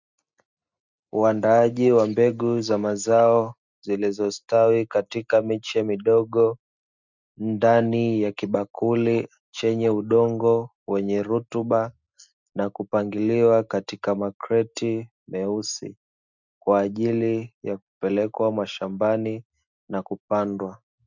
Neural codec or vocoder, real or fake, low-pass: none; real; 7.2 kHz